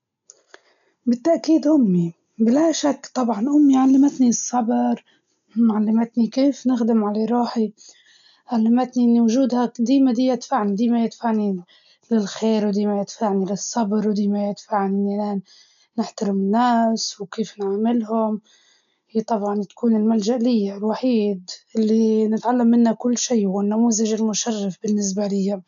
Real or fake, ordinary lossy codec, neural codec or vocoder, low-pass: real; none; none; 7.2 kHz